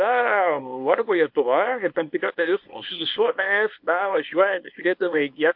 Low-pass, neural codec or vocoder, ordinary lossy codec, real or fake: 5.4 kHz; codec, 24 kHz, 0.9 kbps, WavTokenizer, small release; MP3, 48 kbps; fake